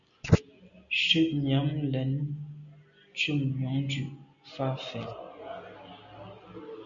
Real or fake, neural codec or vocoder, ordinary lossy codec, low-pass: real; none; MP3, 96 kbps; 7.2 kHz